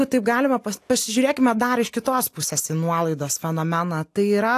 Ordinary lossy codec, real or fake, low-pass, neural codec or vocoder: AAC, 64 kbps; real; 14.4 kHz; none